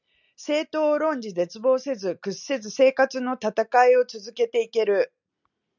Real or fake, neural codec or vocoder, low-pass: real; none; 7.2 kHz